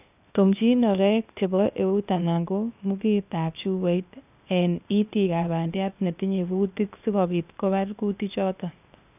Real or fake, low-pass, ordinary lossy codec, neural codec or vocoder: fake; 3.6 kHz; none; codec, 16 kHz, 0.8 kbps, ZipCodec